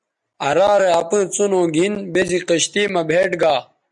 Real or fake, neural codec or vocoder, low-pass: real; none; 10.8 kHz